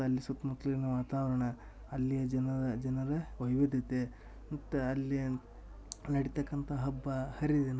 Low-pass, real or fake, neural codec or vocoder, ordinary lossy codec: none; real; none; none